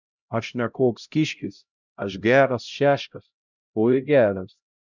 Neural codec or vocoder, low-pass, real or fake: codec, 16 kHz, 0.5 kbps, X-Codec, HuBERT features, trained on LibriSpeech; 7.2 kHz; fake